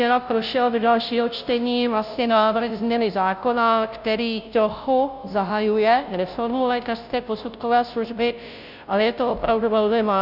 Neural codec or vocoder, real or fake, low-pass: codec, 16 kHz, 0.5 kbps, FunCodec, trained on Chinese and English, 25 frames a second; fake; 5.4 kHz